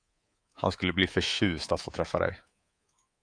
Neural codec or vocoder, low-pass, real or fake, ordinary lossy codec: codec, 24 kHz, 3.1 kbps, DualCodec; 9.9 kHz; fake; AAC, 48 kbps